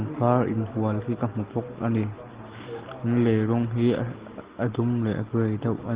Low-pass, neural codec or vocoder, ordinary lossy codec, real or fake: 3.6 kHz; none; Opus, 16 kbps; real